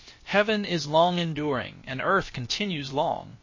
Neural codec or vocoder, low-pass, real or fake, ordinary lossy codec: codec, 16 kHz, about 1 kbps, DyCAST, with the encoder's durations; 7.2 kHz; fake; MP3, 32 kbps